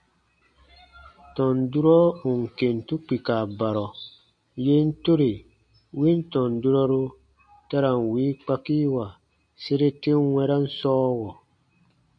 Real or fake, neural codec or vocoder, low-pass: real; none; 9.9 kHz